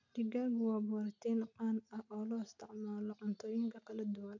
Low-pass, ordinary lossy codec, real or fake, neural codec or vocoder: 7.2 kHz; none; real; none